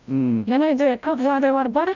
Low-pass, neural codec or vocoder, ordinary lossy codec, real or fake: 7.2 kHz; codec, 16 kHz, 0.5 kbps, FreqCodec, larger model; none; fake